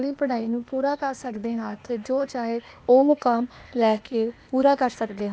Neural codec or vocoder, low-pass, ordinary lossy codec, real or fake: codec, 16 kHz, 0.8 kbps, ZipCodec; none; none; fake